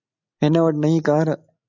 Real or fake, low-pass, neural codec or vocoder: real; 7.2 kHz; none